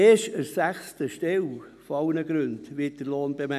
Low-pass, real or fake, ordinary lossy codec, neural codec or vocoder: 14.4 kHz; real; none; none